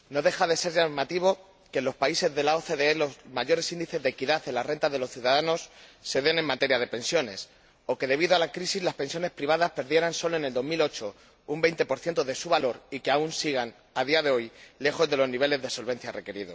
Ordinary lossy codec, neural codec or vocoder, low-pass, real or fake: none; none; none; real